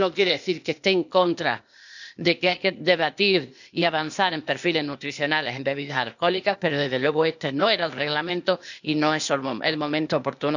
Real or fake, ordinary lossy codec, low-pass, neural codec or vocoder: fake; none; 7.2 kHz; codec, 16 kHz, 0.8 kbps, ZipCodec